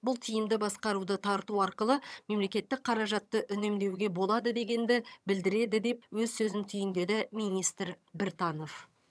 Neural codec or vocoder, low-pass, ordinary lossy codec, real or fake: vocoder, 22.05 kHz, 80 mel bands, HiFi-GAN; none; none; fake